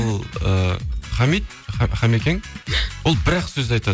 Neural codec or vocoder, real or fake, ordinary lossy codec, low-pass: none; real; none; none